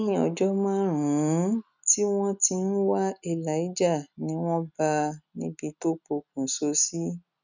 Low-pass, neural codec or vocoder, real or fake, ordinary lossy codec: 7.2 kHz; none; real; none